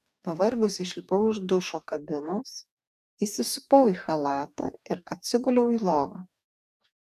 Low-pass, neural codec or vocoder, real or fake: 14.4 kHz; codec, 44.1 kHz, 2.6 kbps, DAC; fake